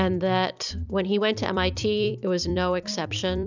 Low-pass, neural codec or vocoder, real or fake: 7.2 kHz; vocoder, 44.1 kHz, 128 mel bands every 256 samples, BigVGAN v2; fake